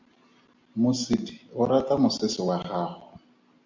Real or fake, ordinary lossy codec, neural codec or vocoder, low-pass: real; MP3, 48 kbps; none; 7.2 kHz